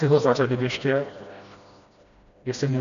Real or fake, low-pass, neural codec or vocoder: fake; 7.2 kHz; codec, 16 kHz, 1 kbps, FreqCodec, smaller model